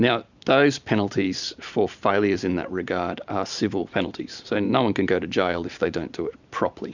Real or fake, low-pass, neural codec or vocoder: fake; 7.2 kHz; vocoder, 44.1 kHz, 128 mel bands every 256 samples, BigVGAN v2